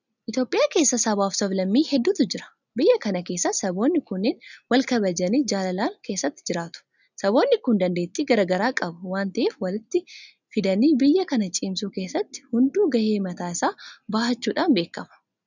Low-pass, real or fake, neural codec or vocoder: 7.2 kHz; real; none